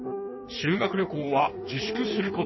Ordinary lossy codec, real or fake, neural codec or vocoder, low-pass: MP3, 24 kbps; fake; codec, 16 kHz in and 24 kHz out, 1.1 kbps, FireRedTTS-2 codec; 7.2 kHz